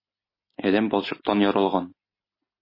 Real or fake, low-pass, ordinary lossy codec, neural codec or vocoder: real; 5.4 kHz; MP3, 24 kbps; none